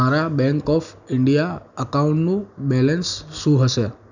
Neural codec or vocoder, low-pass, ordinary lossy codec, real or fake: none; 7.2 kHz; none; real